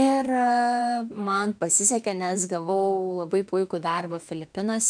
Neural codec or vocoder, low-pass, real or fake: autoencoder, 48 kHz, 32 numbers a frame, DAC-VAE, trained on Japanese speech; 9.9 kHz; fake